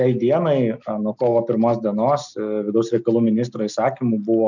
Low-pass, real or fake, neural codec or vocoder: 7.2 kHz; real; none